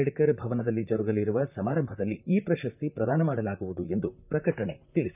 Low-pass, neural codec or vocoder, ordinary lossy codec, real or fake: 3.6 kHz; vocoder, 44.1 kHz, 128 mel bands, Pupu-Vocoder; none; fake